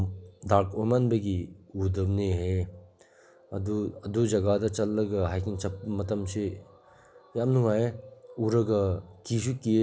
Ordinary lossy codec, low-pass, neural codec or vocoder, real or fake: none; none; none; real